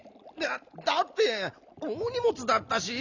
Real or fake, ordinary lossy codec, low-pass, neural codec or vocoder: real; none; 7.2 kHz; none